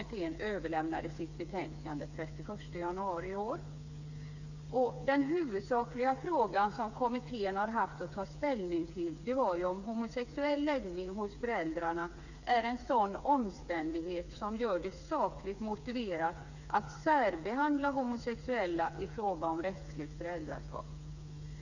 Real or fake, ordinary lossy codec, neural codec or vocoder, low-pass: fake; none; codec, 16 kHz, 4 kbps, FreqCodec, smaller model; 7.2 kHz